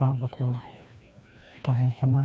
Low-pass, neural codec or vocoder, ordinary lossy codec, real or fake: none; codec, 16 kHz, 1 kbps, FreqCodec, larger model; none; fake